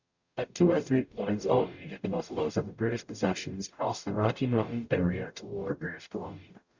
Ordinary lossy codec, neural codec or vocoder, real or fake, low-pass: Opus, 64 kbps; codec, 44.1 kHz, 0.9 kbps, DAC; fake; 7.2 kHz